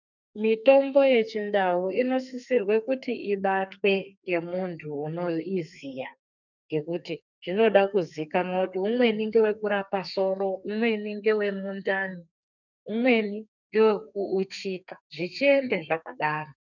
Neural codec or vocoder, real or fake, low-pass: codec, 32 kHz, 1.9 kbps, SNAC; fake; 7.2 kHz